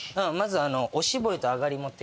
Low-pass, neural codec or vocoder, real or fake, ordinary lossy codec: none; none; real; none